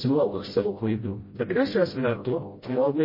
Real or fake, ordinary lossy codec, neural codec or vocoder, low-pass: fake; MP3, 24 kbps; codec, 16 kHz, 0.5 kbps, FreqCodec, smaller model; 5.4 kHz